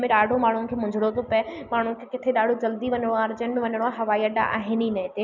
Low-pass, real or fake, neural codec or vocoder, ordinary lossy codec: 7.2 kHz; real; none; none